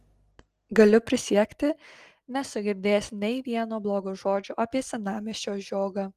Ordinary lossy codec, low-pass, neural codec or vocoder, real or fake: Opus, 24 kbps; 14.4 kHz; none; real